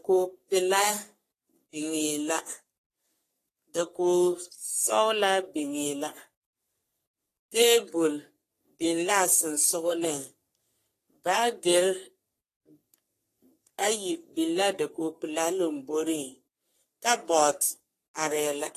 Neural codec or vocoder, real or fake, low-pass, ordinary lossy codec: codec, 44.1 kHz, 3.4 kbps, Pupu-Codec; fake; 14.4 kHz; AAC, 48 kbps